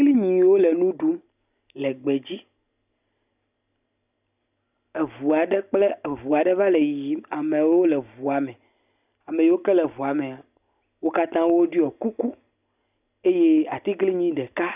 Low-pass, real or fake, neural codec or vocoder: 3.6 kHz; real; none